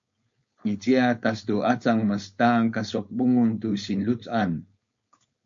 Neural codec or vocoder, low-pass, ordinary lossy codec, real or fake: codec, 16 kHz, 4.8 kbps, FACodec; 7.2 kHz; MP3, 48 kbps; fake